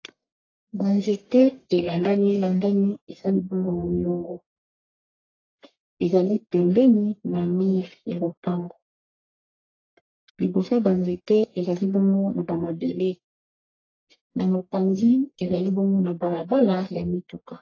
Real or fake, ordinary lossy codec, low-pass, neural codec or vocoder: fake; AAC, 32 kbps; 7.2 kHz; codec, 44.1 kHz, 1.7 kbps, Pupu-Codec